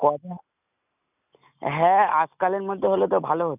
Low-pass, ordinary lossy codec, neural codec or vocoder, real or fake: 3.6 kHz; none; none; real